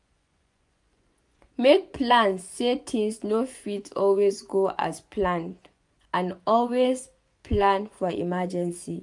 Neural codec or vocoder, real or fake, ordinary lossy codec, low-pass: vocoder, 24 kHz, 100 mel bands, Vocos; fake; none; 10.8 kHz